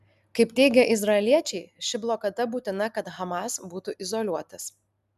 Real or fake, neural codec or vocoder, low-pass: real; none; 14.4 kHz